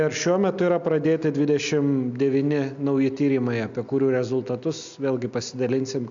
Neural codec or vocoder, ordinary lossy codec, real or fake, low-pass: none; AAC, 64 kbps; real; 7.2 kHz